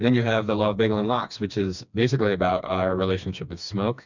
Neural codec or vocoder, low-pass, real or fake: codec, 16 kHz, 2 kbps, FreqCodec, smaller model; 7.2 kHz; fake